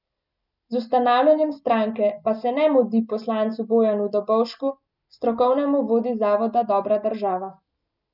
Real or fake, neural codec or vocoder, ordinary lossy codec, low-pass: real; none; none; 5.4 kHz